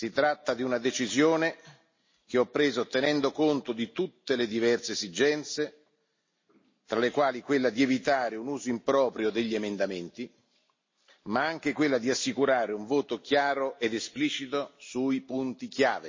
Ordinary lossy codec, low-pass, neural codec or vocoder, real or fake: MP3, 32 kbps; 7.2 kHz; none; real